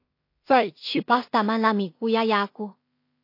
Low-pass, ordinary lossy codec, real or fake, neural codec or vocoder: 5.4 kHz; AAC, 32 kbps; fake; codec, 16 kHz in and 24 kHz out, 0.4 kbps, LongCat-Audio-Codec, two codebook decoder